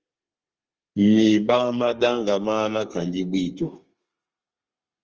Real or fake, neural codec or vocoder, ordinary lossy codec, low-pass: fake; codec, 32 kHz, 1.9 kbps, SNAC; Opus, 24 kbps; 7.2 kHz